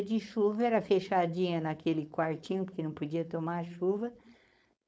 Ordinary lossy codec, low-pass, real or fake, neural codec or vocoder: none; none; fake; codec, 16 kHz, 4.8 kbps, FACodec